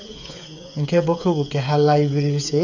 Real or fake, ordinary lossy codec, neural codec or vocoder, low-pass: fake; none; codec, 16 kHz, 8 kbps, FreqCodec, smaller model; 7.2 kHz